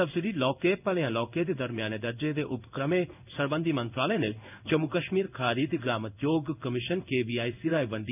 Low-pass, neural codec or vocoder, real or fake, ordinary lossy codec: 3.6 kHz; codec, 16 kHz in and 24 kHz out, 1 kbps, XY-Tokenizer; fake; none